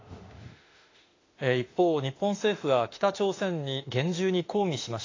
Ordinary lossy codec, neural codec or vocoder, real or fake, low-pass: AAC, 32 kbps; autoencoder, 48 kHz, 32 numbers a frame, DAC-VAE, trained on Japanese speech; fake; 7.2 kHz